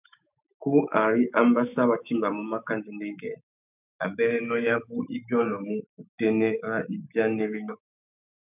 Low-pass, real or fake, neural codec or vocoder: 3.6 kHz; fake; autoencoder, 48 kHz, 128 numbers a frame, DAC-VAE, trained on Japanese speech